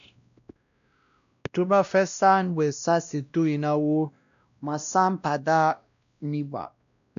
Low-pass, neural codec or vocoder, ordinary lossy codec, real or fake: 7.2 kHz; codec, 16 kHz, 1 kbps, X-Codec, WavLM features, trained on Multilingual LibriSpeech; none; fake